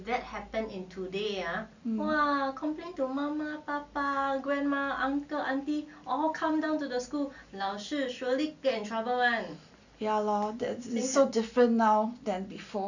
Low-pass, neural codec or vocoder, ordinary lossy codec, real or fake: 7.2 kHz; none; none; real